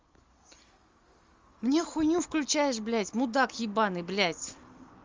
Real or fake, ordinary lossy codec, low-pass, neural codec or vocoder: real; Opus, 32 kbps; 7.2 kHz; none